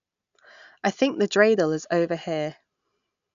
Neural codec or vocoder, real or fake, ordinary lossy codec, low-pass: none; real; none; 7.2 kHz